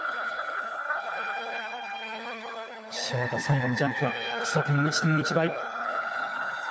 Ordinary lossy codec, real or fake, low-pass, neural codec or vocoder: none; fake; none; codec, 16 kHz, 4 kbps, FunCodec, trained on LibriTTS, 50 frames a second